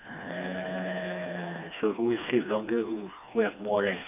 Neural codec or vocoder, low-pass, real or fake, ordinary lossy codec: codec, 16 kHz, 2 kbps, FreqCodec, smaller model; 3.6 kHz; fake; none